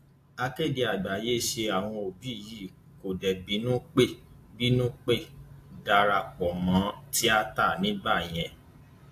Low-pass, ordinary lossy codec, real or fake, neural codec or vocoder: 14.4 kHz; AAC, 64 kbps; real; none